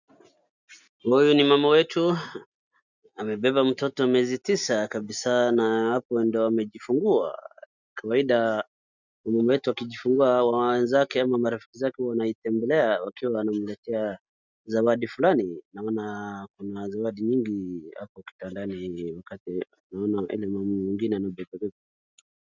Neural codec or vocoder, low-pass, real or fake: none; 7.2 kHz; real